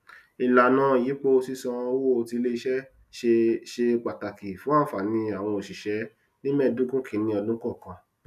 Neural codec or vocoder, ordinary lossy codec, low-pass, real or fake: none; none; 14.4 kHz; real